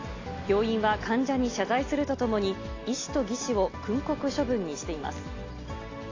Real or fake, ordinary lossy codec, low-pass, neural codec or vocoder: real; AAC, 32 kbps; 7.2 kHz; none